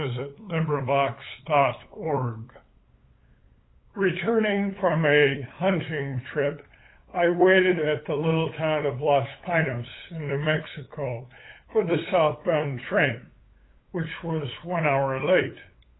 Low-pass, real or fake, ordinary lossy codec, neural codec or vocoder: 7.2 kHz; fake; AAC, 16 kbps; codec, 16 kHz, 8 kbps, FunCodec, trained on LibriTTS, 25 frames a second